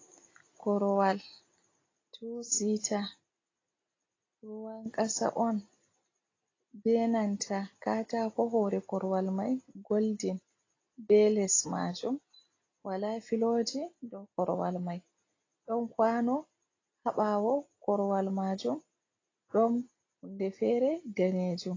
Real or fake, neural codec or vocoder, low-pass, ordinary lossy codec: real; none; 7.2 kHz; AAC, 32 kbps